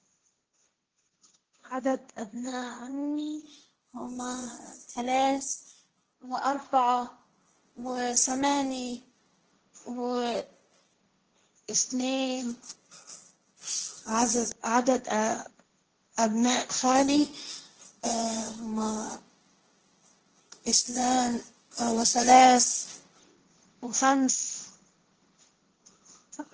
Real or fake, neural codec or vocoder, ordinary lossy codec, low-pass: fake; codec, 16 kHz, 1.1 kbps, Voila-Tokenizer; Opus, 16 kbps; 7.2 kHz